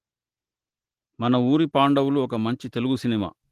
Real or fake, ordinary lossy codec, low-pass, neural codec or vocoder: real; Opus, 16 kbps; 14.4 kHz; none